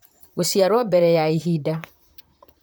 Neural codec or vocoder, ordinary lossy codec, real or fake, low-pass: vocoder, 44.1 kHz, 128 mel bands, Pupu-Vocoder; none; fake; none